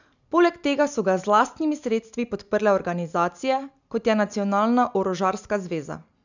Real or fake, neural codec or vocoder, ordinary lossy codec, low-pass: real; none; none; 7.2 kHz